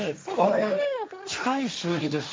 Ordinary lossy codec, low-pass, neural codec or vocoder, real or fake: none; none; codec, 16 kHz, 1.1 kbps, Voila-Tokenizer; fake